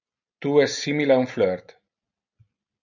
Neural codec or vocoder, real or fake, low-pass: none; real; 7.2 kHz